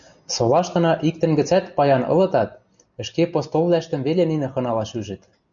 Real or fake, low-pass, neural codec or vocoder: real; 7.2 kHz; none